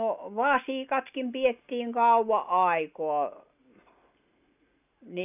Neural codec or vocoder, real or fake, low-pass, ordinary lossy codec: none; real; 3.6 kHz; none